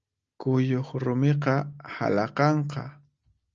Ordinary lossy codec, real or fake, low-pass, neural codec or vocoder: Opus, 24 kbps; real; 7.2 kHz; none